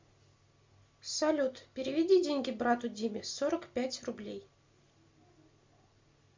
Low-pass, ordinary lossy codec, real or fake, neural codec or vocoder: 7.2 kHz; MP3, 64 kbps; real; none